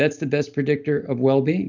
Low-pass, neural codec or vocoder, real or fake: 7.2 kHz; none; real